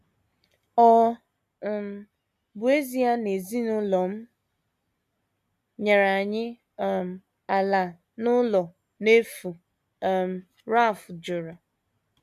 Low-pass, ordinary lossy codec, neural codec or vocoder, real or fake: 14.4 kHz; none; none; real